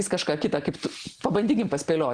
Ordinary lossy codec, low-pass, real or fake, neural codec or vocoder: Opus, 16 kbps; 9.9 kHz; real; none